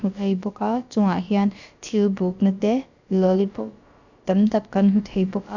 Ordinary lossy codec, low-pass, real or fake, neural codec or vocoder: none; 7.2 kHz; fake; codec, 16 kHz, about 1 kbps, DyCAST, with the encoder's durations